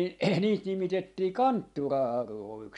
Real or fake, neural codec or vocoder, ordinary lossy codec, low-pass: real; none; MP3, 64 kbps; 10.8 kHz